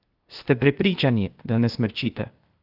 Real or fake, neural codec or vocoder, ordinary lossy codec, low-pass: fake; codec, 16 kHz, 0.8 kbps, ZipCodec; Opus, 32 kbps; 5.4 kHz